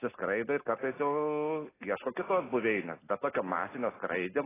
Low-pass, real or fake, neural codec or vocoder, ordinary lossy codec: 3.6 kHz; real; none; AAC, 16 kbps